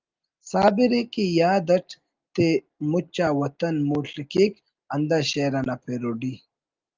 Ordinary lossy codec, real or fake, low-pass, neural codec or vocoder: Opus, 32 kbps; real; 7.2 kHz; none